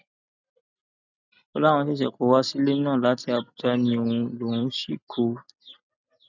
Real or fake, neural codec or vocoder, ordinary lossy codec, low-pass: real; none; none; 7.2 kHz